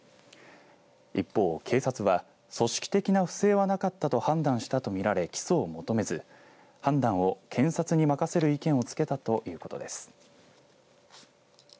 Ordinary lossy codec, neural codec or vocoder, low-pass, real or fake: none; none; none; real